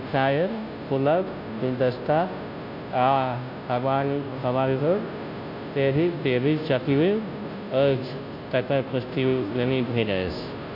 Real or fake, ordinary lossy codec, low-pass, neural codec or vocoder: fake; MP3, 48 kbps; 5.4 kHz; codec, 16 kHz, 0.5 kbps, FunCodec, trained on Chinese and English, 25 frames a second